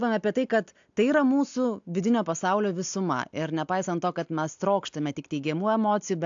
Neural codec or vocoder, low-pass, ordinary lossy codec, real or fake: none; 7.2 kHz; AAC, 64 kbps; real